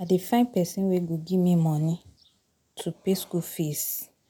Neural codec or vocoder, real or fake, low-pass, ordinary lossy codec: none; real; none; none